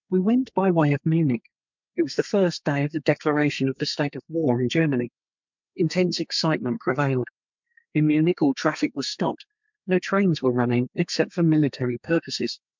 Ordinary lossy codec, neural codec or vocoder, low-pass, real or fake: MP3, 64 kbps; codec, 32 kHz, 1.9 kbps, SNAC; 7.2 kHz; fake